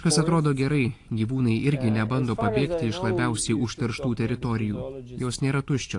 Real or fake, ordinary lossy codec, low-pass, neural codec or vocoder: real; AAC, 48 kbps; 10.8 kHz; none